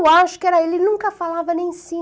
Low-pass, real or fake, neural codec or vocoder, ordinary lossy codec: none; real; none; none